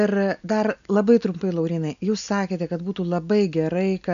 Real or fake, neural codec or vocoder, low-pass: real; none; 7.2 kHz